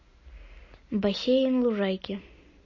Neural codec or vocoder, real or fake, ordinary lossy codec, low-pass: none; real; MP3, 32 kbps; 7.2 kHz